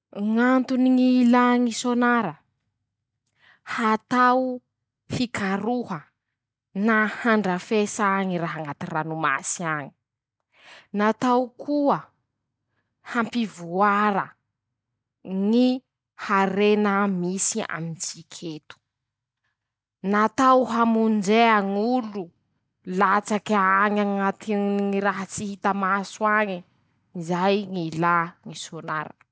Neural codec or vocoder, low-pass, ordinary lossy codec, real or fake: none; none; none; real